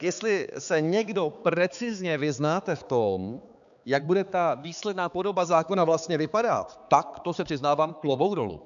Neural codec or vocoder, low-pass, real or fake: codec, 16 kHz, 4 kbps, X-Codec, HuBERT features, trained on balanced general audio; 7.2 kHz; fake